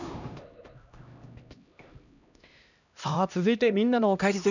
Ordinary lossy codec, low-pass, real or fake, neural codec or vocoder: none; 7.2 kHz; fake; codec, 16 kHz, 1 kbps, X-Codec, HuBERT features, trained on LibriSpeech